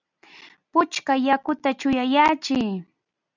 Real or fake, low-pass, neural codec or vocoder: real; 7.2 kHz; none